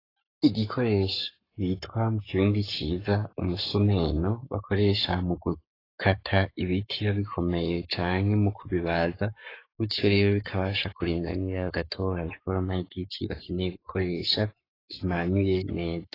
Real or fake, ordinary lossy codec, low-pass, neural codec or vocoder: fake; AAC, 24 kbps; 5.4 kHz; codec, 44.1 kHz, 7.8 kbps, Pupu-Codec